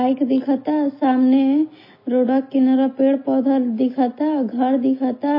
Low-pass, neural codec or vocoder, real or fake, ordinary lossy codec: 5.4 kHz; none; real; MP3, 24 kbps